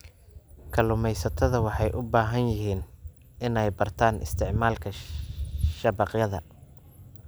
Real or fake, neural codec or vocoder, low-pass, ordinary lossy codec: real; none; none; none